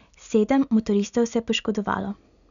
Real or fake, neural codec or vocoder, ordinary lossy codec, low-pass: real; none; none; 7.2 kHz